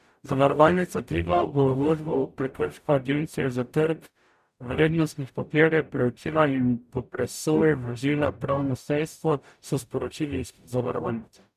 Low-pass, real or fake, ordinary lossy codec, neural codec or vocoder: 14.4 kHz; fake; none; codec, 44.1 kHz, 0.9 kbps, DAC